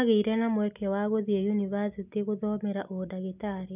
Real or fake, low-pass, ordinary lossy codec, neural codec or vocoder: real; 3.6 kHz; none; none